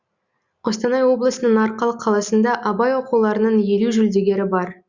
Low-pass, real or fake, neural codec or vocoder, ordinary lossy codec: 7.2 kHz; real; none; Opus, 64 kbps